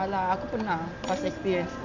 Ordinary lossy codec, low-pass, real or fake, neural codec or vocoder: none; 7.2 kHz; real; none